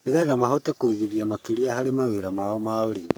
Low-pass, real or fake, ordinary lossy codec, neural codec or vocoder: none; fake; none; codec, 44.1 kHz, 3.4 kbps, Pupu-Codec